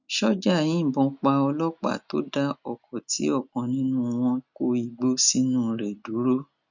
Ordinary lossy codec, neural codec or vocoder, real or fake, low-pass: none; none; real; 7.2 kHz